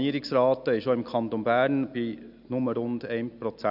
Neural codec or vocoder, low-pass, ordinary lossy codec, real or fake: none; 5.4 kHz; none; real